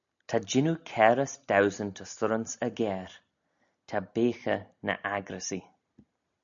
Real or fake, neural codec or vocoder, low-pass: real; none; 7.2 kHz